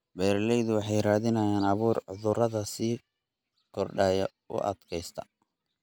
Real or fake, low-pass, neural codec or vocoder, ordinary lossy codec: real; none; none; none